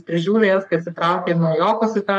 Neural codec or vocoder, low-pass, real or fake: codec, 44.1 kHz, 3.4 kbps, Pupu-Codec; 10.8 kHz; fake